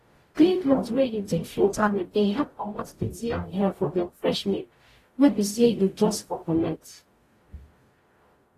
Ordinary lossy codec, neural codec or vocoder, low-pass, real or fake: AAC, 48 kbps; codec, 44.1 kHz, 0.9 kbps, DAC; 14.4 kHz; fake